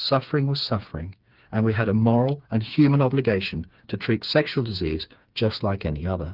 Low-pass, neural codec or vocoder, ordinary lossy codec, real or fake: 5.4 kHz; codec, 16 kHz, 4 kbps, FreqCodec, smaller model; Opus, 32 kbps; fake